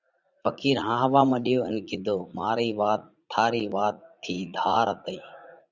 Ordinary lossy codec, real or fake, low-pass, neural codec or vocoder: Opus, 64 kbps; fake; 7.2 kHz; vocoder, 44.1 kHz, 80 mel bands, Vocos